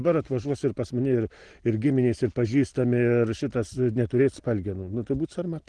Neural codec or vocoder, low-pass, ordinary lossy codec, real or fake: none; 9.9 kHz; Opus, 16 kbps; real